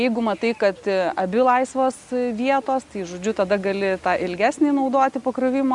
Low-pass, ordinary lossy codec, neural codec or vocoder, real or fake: 10.8 kHz; Opus, 64 kbps; none; real